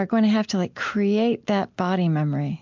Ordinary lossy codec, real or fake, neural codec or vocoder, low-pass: MP3, 64 kbps; real; none; 7.2 kHz